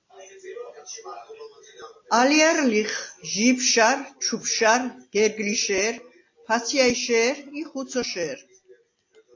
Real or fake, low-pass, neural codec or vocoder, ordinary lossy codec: real; 7.2 kHz; none; AAC, 48 kbps